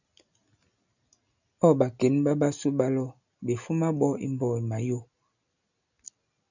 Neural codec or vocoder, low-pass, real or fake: none; 7.2 kHz; real